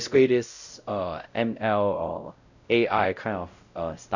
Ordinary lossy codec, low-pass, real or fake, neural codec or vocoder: none; 7.2 kHz; fake; codec, 16 kHz, 0.5 kbps, X-Codec, WavLM features, trained on Multilingual LibriSpeech